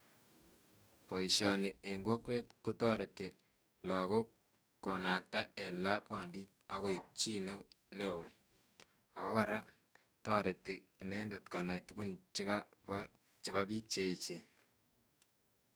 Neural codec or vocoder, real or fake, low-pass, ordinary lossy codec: codec, 44.1 kHz, 2.6 kbps, DAC; fake; none; none